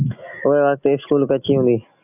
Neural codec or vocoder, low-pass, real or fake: none; 3.6 kHz; real